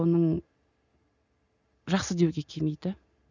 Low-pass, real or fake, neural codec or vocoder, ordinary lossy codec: 7.2 kHz; real; none; none